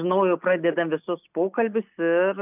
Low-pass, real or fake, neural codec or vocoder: 3.6 kHz; real; none